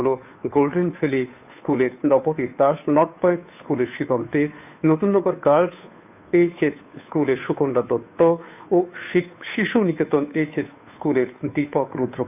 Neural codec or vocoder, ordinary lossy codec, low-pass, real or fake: codec, 16 kHz, 2 kbps, FunCodec, trained on Chinese and English, 25 frames a second; none; 3.6 kHz; fake